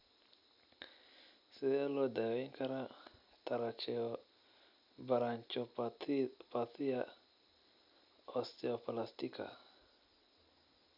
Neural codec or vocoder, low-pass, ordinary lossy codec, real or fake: none; 5.4 kHz; none; real